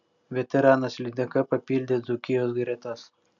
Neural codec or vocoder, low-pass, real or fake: none; 7.2 kHz; real